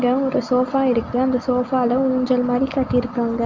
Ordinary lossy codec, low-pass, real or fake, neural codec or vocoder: Opus, 32 kbps; 7.2 kHz; real; none